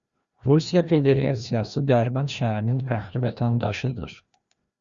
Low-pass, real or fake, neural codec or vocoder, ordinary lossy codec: 7.2 kHz; fake; codec, 16 kHz, 1 kbps, FreqCodec, larger model; Opus, 64 kbps